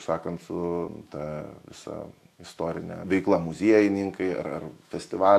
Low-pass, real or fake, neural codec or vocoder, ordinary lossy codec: 14.4 kHz; fake; autoencoder, 48 kHz, 128 numbers a frame, DAC-VAE, trained on Japanese speech; AAC, 64 kbps